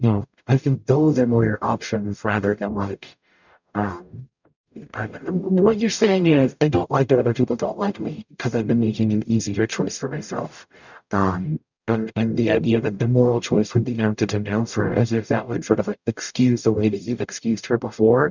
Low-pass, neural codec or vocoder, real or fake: 7.2 kHz; codec, 44.1 kHz, 0.9 kbps, DAC; fake